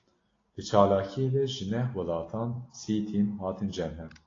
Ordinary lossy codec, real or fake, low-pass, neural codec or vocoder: AAC, 32 kbps; real; 7.2 kHz; none